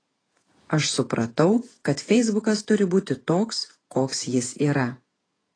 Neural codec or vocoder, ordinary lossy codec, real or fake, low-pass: vocoder, 48 kHz, 128 mel bands, Vocos; AAC, 32 kbps; fake; 9.9 kHz